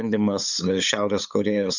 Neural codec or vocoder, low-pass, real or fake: codec, 16 kHz, 8 kbps, FunCodec, trained on LibriTTS, 25 frames a second; 7.2 kHz; fake